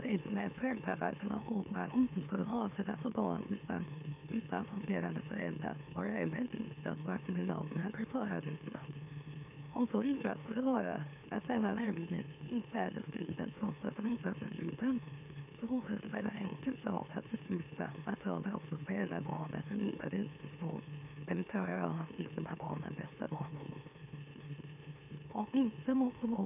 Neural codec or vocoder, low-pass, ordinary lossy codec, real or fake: autoencoder, 44.1 kHz, a latent of 192 numbers a frame, MeloTTS; 3.6 kHz; none; fake